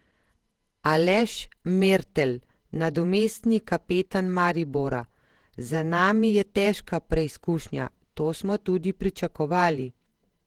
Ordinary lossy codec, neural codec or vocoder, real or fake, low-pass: Opus, 16 kbps; vocoder, 48 kHz, 128 mel bands, Vocos; fake; 19.8 kHz